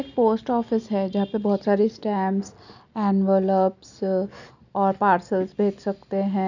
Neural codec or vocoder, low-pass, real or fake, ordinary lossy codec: none; 7.2 kHz; real; none